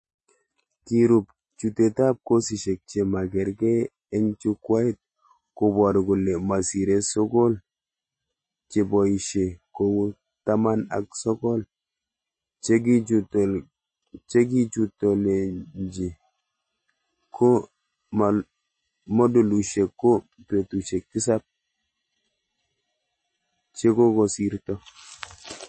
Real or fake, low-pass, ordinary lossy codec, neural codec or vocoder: real; 10.8 kHz; MP3, 32 kbps; none